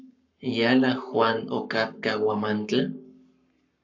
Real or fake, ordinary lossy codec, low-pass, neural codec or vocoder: fake; AAC, 48 kbps; 7.2 kHz; codec, 44.1 kHz, 7.8 kbps, Pupu-Codec